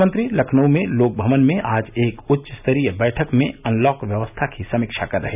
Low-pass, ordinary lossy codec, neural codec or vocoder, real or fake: 3.6 kHz; none; none; real